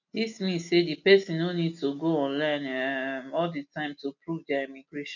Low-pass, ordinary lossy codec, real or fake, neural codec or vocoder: 7.2 kHz; none; real; none